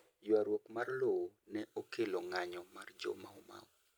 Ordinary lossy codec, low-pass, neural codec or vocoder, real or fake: none; none; none; real